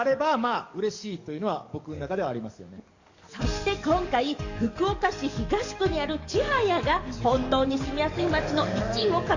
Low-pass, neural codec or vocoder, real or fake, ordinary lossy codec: 7.2 kHz; codec, 44.1 kHz, 7.8 kbps, DAC; fake; Opus, 64 kbps